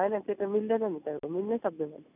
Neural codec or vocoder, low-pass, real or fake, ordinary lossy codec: none; 3.6 kHz; real; none